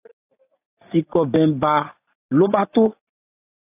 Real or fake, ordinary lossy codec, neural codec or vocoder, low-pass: real; AAC, 24 kbps; none; 3.6 kHz